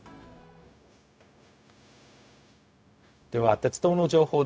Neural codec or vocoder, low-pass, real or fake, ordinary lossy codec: codec, 16 kHz, 0.4 kbps, LongCat-Audio-Codec; none; fake; none